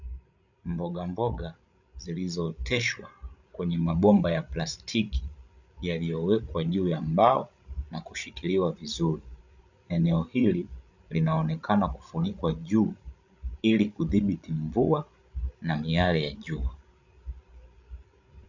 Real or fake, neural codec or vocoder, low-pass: fake; codec, 16 kHz, 16 kbps, FreqCodec, larger model; 7.2 kHz